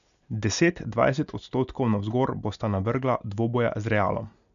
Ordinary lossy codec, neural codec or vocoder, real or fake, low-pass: none; none; real; 7.2 kHz